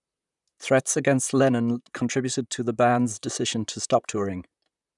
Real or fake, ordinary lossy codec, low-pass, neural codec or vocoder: fake; none; 10.8 kHz; vocoder, 44.1 kHz, 128 mel bands, Pupu-Vocoder